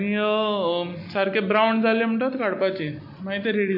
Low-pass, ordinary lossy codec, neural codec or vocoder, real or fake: 5.4 kHz; MP3, 32 kbps; none; real